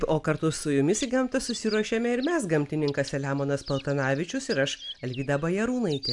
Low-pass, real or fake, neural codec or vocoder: 10.8 kHz; real; none